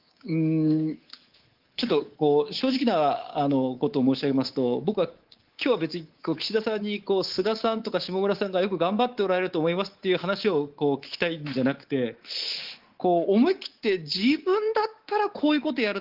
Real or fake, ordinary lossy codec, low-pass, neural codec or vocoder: fake; Opus, 16 kbps; 5.4 kHz; codec, 24 kHz, 3.1 kbps, DualCodec